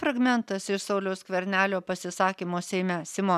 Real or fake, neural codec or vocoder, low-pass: real; none; 14.4 kHz